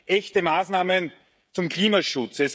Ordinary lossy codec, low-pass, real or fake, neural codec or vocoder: none; none; fake; codec, 16 kHz, 16 kbps, FreqCodec, smaller model